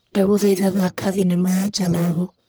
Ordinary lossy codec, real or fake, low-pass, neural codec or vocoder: none; fake; none; codec, 44.1 kHz, 1.7 kbps, Pupu-Codec